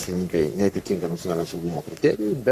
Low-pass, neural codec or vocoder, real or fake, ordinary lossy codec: 14.4 kHz; codec, 44.1 kHz, 3.4 kbps, Pupu-Codec; fake; Opus, 64 kbps